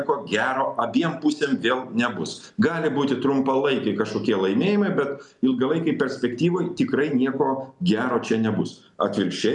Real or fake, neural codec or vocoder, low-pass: real; none; 10.8 kHz